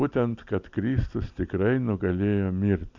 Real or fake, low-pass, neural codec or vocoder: real; 7.2 kHz; none